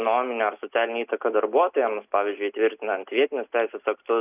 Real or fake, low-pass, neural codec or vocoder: real; 3.6 kHz; none